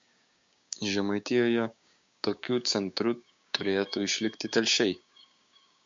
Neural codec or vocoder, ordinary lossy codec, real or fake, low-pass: codec, 16 kHz, 6 kbps, DAC; MP3, 48 kbps; fake; 7.2 kHz